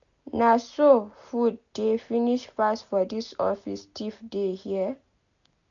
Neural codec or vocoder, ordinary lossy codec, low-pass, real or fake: none; none; 7.2 kHz; real